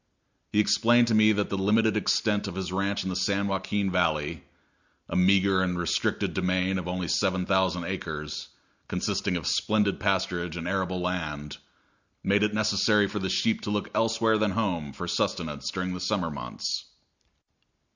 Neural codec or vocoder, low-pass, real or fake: none; 7.2 kHz; real